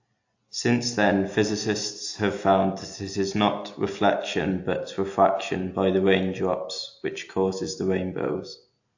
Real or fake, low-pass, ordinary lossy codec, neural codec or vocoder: real; 7.2 kHz; AAC, 48 kbps; none